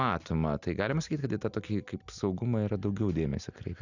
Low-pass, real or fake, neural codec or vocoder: 7.2 kHz; real; none